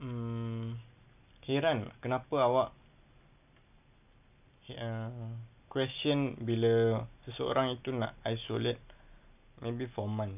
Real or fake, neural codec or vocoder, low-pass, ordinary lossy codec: real; none; 3.6 kHz; none